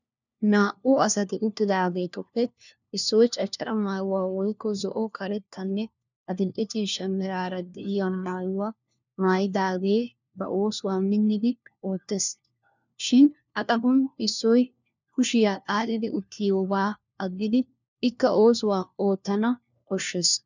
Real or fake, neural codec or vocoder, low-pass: fake; codec, 16 kHz, 1 kbps, FunCodec, trained on LibriTTS, 50 frames a second; 7.2 kHz